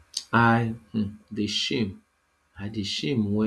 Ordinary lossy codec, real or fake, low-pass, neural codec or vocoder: none; real; none; none